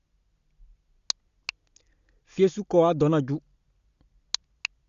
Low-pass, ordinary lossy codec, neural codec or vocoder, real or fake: 7.2 kHz; Opus, 64 kbps; none; real